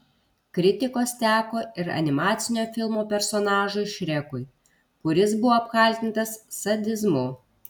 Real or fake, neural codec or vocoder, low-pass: real; none; 19.8 kHz